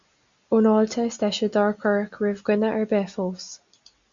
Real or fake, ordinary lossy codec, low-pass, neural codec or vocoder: real; Opus, 64 kbps; 7.2 kHz; none